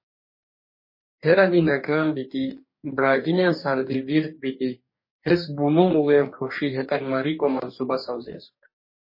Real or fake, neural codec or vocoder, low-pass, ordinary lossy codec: fake; codec, 44.1 kHz, 2.6 kbps, DAC; 5.4 kHz; MP3, 24 kbps